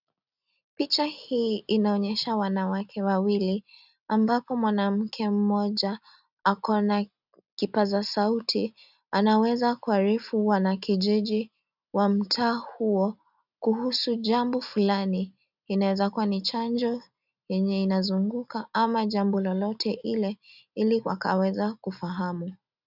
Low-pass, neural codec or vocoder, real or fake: 5.4 kHz; none; real